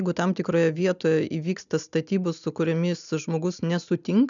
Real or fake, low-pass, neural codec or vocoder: real; 7.2 kHz; none